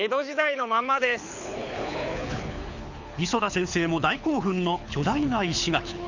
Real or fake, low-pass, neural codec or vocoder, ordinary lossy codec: fake; 7.2 kHz; codec, 24 kHz, 6 kbps, HILCodec; none